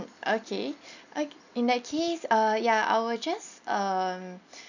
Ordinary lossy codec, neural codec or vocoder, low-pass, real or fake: none; none; 7.2 kHz; real